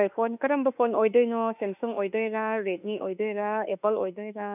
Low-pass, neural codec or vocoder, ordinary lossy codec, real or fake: 3.6 kHz; autoencoder, 48 kHz, 32 numbers a frame, DAC-VAE, trained on Japanese speech; none; fake